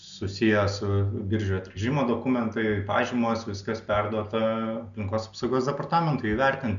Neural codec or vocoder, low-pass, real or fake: none; 7.2 kHz; real